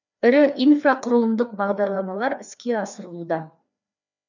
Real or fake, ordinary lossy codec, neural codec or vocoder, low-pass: fake; none; codec, 16 kHz, 2 kbps, FreqCodec, larger model; 7.2 kHz